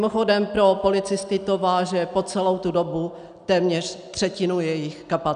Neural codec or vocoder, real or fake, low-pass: none; real; 9.9 kHz